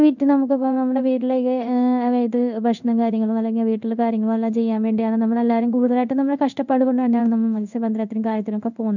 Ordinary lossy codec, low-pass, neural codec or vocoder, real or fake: none; 7.2 kHz; codec, 16 kHz in and 24 kHz out, 1 kbps, XY-Tokenizer; fake